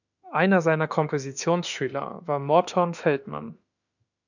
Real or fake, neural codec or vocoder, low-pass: fake; autoencoder, 48 kHz, 32 numbers a frame, DAC-VAE, trained on Japanese speech; 7.2 kHz